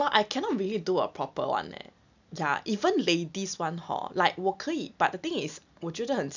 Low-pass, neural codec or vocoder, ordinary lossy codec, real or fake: 7.2 kHz; none; none; real